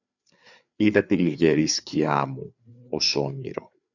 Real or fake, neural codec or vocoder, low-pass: fake; codec, 16 kHz, 4 kbps, FreqCodec, larger model; 7.2 kHz